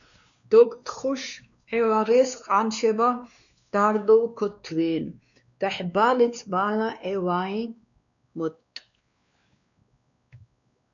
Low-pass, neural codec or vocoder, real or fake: 7.2 kHz; codec, 16 kHz, 2 kbps, X-Codec, WavLM features, trained on Multilingual LibriSpeech; fake